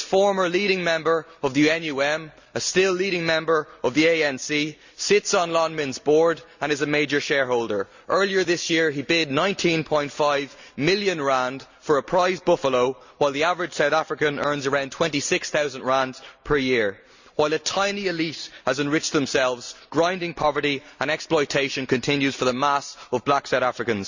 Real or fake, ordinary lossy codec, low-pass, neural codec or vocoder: real; Opus, 64 kbps; 7.2 kHz; none